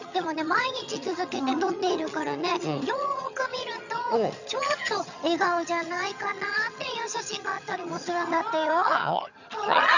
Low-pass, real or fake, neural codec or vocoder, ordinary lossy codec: 7.2 kHz; fake; vocoder, 22.05 kHz, 80 mel bands, HiFi-GAN; none